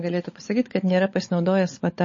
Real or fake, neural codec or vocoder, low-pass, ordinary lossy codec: fake; codec, 16 kHz, 16 kbps, FunCodec, trained on LibriTTS, 50 frames a second; 7.2 kHz; MP3, 32 kbps